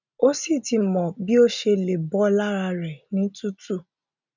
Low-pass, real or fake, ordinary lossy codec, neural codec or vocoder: 7.2 kHz; real; none; none